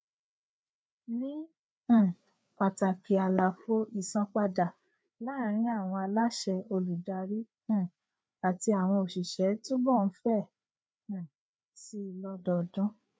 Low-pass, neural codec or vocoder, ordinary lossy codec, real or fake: none; codec, 16 kHz, 4 kbps, FreqCodec, larger model; none; fake